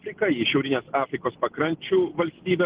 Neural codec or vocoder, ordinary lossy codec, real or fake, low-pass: none; Opus, 16 kbps; real; 3.6 kHz